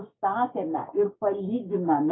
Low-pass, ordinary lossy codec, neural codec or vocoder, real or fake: 7.2 kHz; AAC, 16 kbps; codec, 16 kHz, 6 kbps, DAC; fake